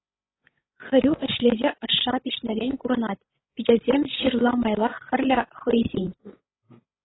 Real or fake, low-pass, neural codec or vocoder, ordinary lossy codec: fake; 7.2 kHz; codec, 16 kHz, 8 kbps, FreqCodec, larger model; AAC, 16 kbps